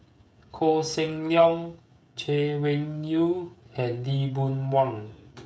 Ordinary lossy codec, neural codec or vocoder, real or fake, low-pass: none; codec, 16 kHz, 16 kbps, FreqCodec, smaller model; fake; none